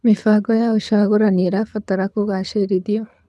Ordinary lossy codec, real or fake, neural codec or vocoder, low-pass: none; fake; codec, 24 kHz, 6 kbps, HILCodec; none